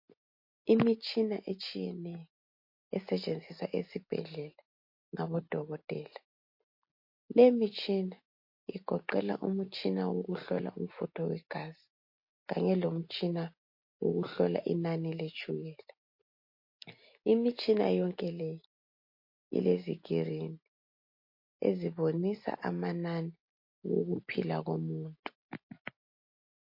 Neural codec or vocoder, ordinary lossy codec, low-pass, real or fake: none; MP3, 32 kbps; 5.4 kHz; real